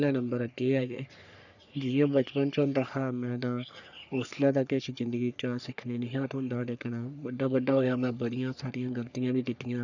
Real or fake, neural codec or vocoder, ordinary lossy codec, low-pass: fake; codec, 44.1 kHz, 3.4 kbps, Pupu-Codec; none; 7.2 kHz